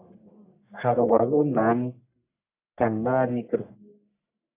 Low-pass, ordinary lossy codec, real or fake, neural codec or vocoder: 3.6 kHz; AAC, 24 kbps; fake; codec, 44.1 kHz, 1.7 kbps, Pupu-Codec